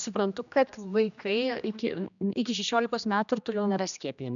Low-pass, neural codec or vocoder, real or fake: 7.2 kHz; codec, 16 kHz, 1 kbps, X-Codec, HuBERT features, trained on general audio; fake